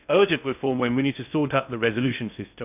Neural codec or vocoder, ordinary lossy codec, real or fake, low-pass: codec, 16 kHz in and 24 kHz out, 0.6 kbps, FocalCodec, streaming, 2048 codes; none; fake; 3.6 kHz